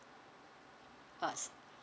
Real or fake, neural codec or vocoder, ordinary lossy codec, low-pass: real; none; none; none